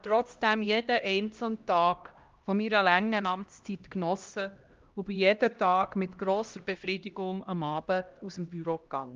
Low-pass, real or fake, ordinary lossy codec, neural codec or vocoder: 7.2 kHz; fake; Opus, 32 kbps; codec, 16 kHz, 1 kbps, X-Codec, HuBERT features, trained on LibriSpeech